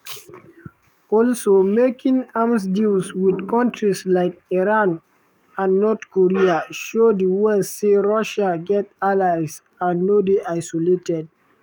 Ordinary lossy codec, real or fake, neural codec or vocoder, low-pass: none; fake; codec, 44.1 kHz, 7.8 kbps, DAC; 19.8 kHz